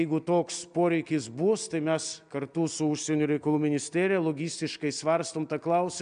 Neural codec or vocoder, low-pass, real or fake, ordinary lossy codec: none; 9.9 kHz; real; MP3, 96 kbps